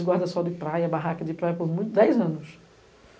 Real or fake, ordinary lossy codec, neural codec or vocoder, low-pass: real; none; none; none